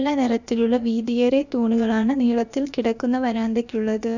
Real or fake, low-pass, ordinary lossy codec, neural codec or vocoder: fake; 7.2 kHz; none; codec, 16 kHz, about 1 kbps, DyCAST, with the encoder's durations